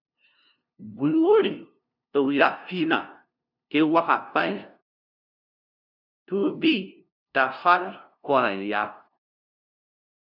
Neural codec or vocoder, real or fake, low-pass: codec, 16 kHz, 0.5 kbps, FunCodec, trained on LibriTTS, 25 frames a second; fake; 5.4 kHz